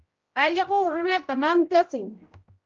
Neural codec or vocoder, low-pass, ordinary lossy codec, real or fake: codec, 16 kHz, 0.5 kbps, X-Codec, HuBERT features, trained on general audio; 7.2 kHz; Opus, 24 kbps; fake